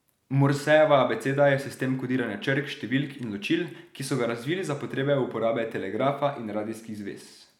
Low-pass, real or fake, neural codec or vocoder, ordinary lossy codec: 19.8 kHz; real; none; none